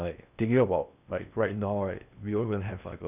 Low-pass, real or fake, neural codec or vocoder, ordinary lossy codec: 3.6 kHz; fake; codec, 16 kHz in and 24 kHz out, 0.8 kbps, FocalCodec, streaming, 65536 codes; none